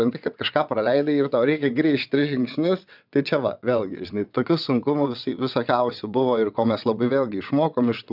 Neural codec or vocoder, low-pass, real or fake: vocoder, 22.05 kHz, 80 mel bands, WaveNeXt; 5.4 kHz; fake